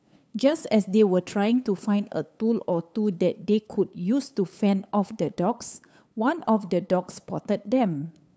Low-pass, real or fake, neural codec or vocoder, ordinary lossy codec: none; fake; codec, 16 kHz, 8 kbps, FunCodec, trained on LibriTTS, 25 frames a second; none